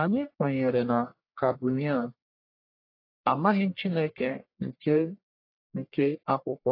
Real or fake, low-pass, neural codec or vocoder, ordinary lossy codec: fake; 5.4 kHz; codec, 44.1 kHz, 1.7 kbps, Pupu-Codec; AAC, 32 kbps